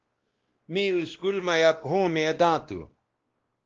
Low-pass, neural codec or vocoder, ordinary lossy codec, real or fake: 7.2 kHz; codec, 16 kHz, 1 kbps, X-Codec, WavLM features, trained on Multilingual LibriSpeech; Opus, 16 kbps; fake